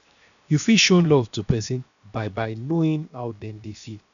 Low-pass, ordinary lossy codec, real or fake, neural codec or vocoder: 7.2 kHz; none; fake; codec, 16 kHz, 0.7 kbps, FocalCodec